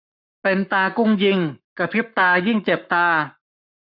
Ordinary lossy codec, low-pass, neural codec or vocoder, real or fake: none; 5.4 kHz; codec, 44.1 kHz, 7.8 kbps, Pupu-Codec; fake